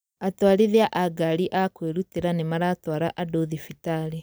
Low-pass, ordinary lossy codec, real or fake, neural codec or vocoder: none; none; real; none